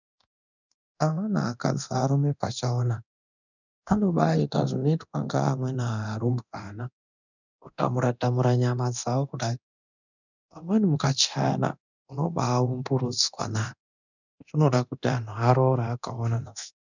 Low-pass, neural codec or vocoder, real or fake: 7.2 kHz; codec, 24 kHz, 0.9 kbps, DualCodec; fake